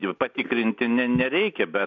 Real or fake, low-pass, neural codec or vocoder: real; 7.2 kHz; none